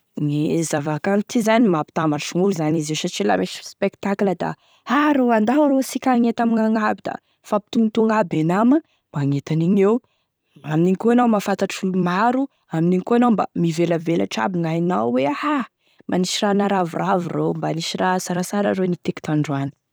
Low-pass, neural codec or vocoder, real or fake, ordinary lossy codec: none; vocoder, 44.1 kHz, 128 mel bands every 256 samples, BigVGAN v2; fake; none